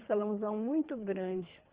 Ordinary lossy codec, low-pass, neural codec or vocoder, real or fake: Opus, 24 kbps; 3.6 kHz; codec, 24 kHz, 3 kbps, HILCodec; fake